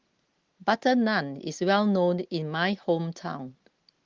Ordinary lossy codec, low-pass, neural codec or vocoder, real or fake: Opus, 16 kbps; 7.2 kHz; none; real